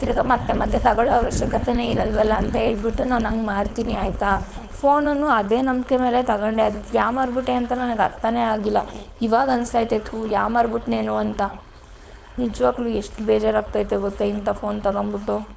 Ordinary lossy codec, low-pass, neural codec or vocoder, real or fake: none; none; codec, 16 kHz, 4.8 kbps, FACodec; fake